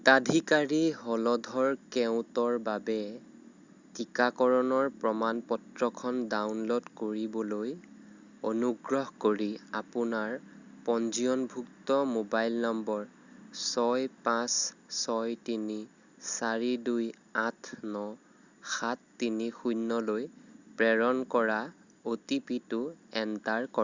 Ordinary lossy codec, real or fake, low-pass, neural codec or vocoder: Opus, 64 kbps; real; 7.2 kHz; none